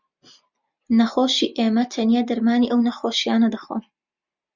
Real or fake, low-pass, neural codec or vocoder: real; 7.2 kHz; none